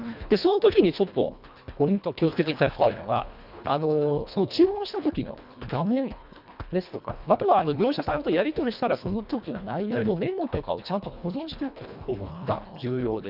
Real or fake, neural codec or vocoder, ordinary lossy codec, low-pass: fake; codec, 24 kHz, 1.5 kbps, HILCodec; none; 5.4 kHz